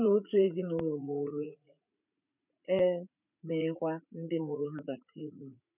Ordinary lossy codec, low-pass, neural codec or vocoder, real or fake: none; 3.6 kHz; vocoder, 44.1 kHz, 80 mel bands, Vocos; fake